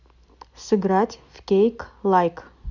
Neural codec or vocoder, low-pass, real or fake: none; 7.2 kHz; real